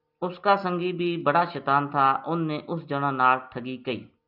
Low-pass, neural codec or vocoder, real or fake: 5.4 kHz; none; real